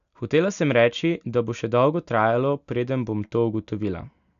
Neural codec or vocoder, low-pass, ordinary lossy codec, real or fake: none; 7.2 kHz; none; real